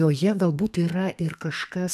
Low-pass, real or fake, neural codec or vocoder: 14.4 kHz; fake; codec, 32 kHz, 1.9 kbps, SNAC